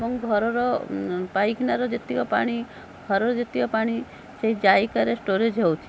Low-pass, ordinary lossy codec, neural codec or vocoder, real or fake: none; none; none; real